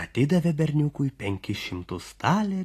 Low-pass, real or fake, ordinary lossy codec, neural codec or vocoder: 14.4 kHz; real; AAC, 48 kbps; none